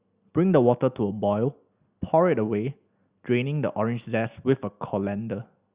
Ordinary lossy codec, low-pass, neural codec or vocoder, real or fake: Opus, 64 kbps; 3.6 kHz; none; real